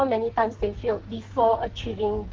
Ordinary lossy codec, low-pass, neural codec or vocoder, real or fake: Opus, 16 kbps; 7.2 kHz; codec, 44.1 kHz, 2.6 kbps, SNAC; fake